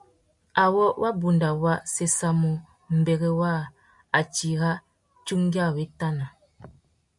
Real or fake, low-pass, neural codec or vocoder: real; 10.8 kHz; none